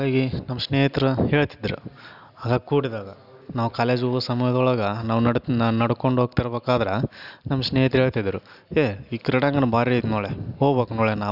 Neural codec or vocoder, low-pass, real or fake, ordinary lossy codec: none; 5.4 kHz; real; none